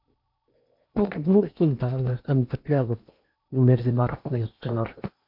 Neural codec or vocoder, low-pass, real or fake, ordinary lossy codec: codec, 16 kHz in and 24 kHz out, 0.8 kbps, FocalCodec, streaming, 65536 codes; 5.4 kHz; fake; MP3, 48 kbps